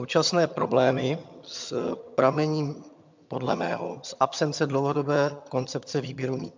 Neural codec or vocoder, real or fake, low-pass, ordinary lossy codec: vocoder, 22.05 kHz, 80 mel bands, HiFi-GAN; fake; 7.2 kHz; MP3, 64 kbps